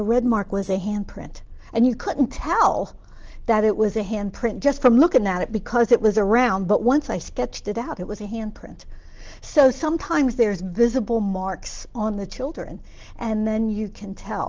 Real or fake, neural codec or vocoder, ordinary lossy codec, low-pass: real; none; Opus, 16 kbps; 7.2 kHz